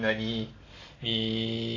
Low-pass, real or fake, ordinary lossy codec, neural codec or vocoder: 7.2 kHz; real; AAC, 32 kbps; none